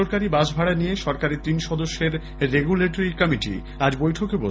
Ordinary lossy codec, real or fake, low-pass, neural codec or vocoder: none; real; none; none